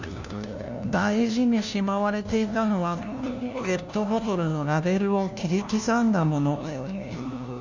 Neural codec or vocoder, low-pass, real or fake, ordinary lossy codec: codec, 16 kHz, 1 kbps, FunCodec, trained on LibriTTS, 50 frames a second; 7.2 kHz; fake; none